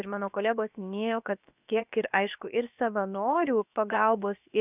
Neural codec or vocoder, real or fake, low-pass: codec, 16 kHz, about 1 kbps, DyCAST, with the encoder's durations; fake; 3.6 kHz